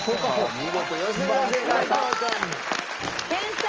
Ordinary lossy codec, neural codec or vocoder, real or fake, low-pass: Opus, 24 kbps; none; real; 7.2 kHz